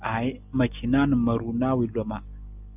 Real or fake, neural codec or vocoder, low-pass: real; none; 3.6 kHz